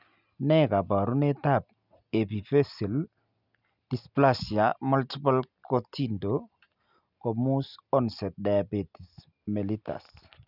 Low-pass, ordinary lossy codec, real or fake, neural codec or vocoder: 5.4 kHz; none; real; none